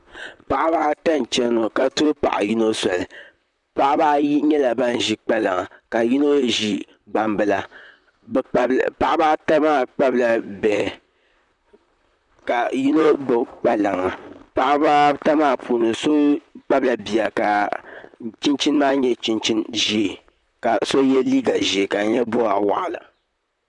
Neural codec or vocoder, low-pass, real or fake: vocoder, 44.1 kHz, 128 mel bands, Pupu-Vocoder; 10.8 kHz; fake